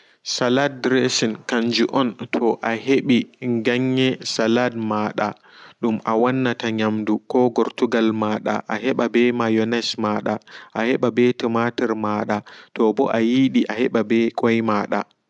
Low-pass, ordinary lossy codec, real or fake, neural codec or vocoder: 10.8 kHz; none; real; none